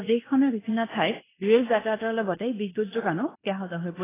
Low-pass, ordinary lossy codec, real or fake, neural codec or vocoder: 3.6 kHz; AAC, 16 kbps; fake; codec, 16 kHz in and 24 kHz out, 0.9 kbps, LongCat-Audio-Codec, fine tuned four codebook decoder